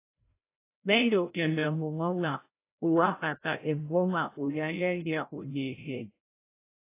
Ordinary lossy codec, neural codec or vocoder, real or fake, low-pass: AAC, 24 kbps; codec, 16 kHz, 0.5 kbps, FreqCodec, larger model; fake; 3.6 kHz